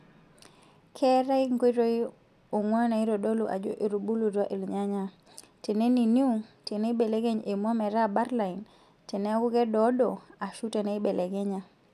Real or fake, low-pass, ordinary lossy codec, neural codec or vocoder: real; 14.4 kHz; none; none